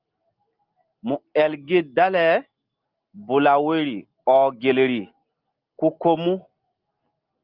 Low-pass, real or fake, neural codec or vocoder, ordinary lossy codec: 5.4 kHz; real; none; Opus, 24 kbps